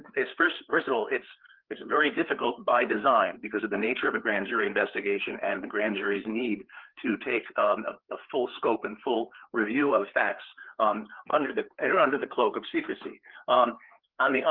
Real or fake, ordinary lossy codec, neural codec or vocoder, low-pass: fake; Opus, 16 kbps; codec, 16 kHz, 4 kbps, FreqCodec, larger model; 5.4 kHz